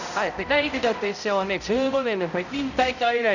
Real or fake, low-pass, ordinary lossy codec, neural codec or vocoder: fake; 7.2 kHz; none; codec, 16 kHz, 0.5 kbps, X-Codec, HuBERT features, trained on balanced general audio